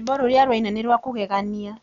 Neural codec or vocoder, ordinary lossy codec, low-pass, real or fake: none; none; 7.2 kHz; real